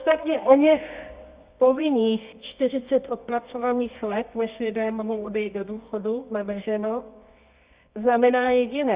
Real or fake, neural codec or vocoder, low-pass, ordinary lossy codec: fake; codec, 24 kHz, 0.9 kbps, WavTokenizer, medium music audio release; 3.6 kHz; Opus, 64 kbps